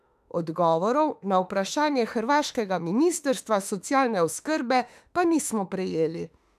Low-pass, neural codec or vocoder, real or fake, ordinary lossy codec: 14.4 kHz; autoencoder, 48 kHz, 32 numbers a frame, DAC-VAE, trained on Japanese speech; fake; none